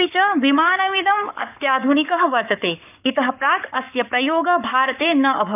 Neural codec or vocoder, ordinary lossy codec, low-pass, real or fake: codec, 44.1 kHz, 7.8 kbps, Pupu-Codec; none; 3.6 kHz; fake